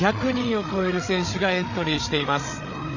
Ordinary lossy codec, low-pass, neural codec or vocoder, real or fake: none; 7.2 kHz; codec, 16 kHz, 8 kbps, FreqCodec, larger model; fake